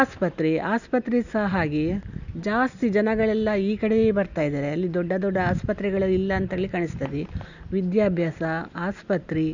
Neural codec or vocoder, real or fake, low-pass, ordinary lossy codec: none; real; 7.2 kHz; none